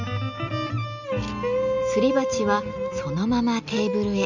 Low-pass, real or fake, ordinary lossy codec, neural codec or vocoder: 7.2 kHz; real; none; none